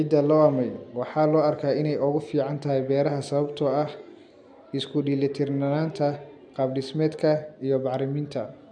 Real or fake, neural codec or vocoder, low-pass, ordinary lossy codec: real; none; 9.9 kHz; none